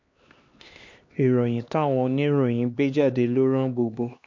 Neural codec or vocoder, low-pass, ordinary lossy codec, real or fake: codec, 16 kHz, 2 kbps, X-Codec, HuBERT features, trained on LibriSpeech; 7.2 kHz; MP3, 48 kbps; fake